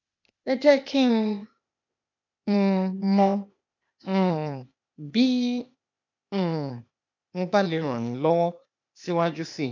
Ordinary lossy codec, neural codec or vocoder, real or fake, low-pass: MP3, 64 kbps; codec, 16 kHz, 0.8 kbps, ZipCodec; fake; 7.2 kHz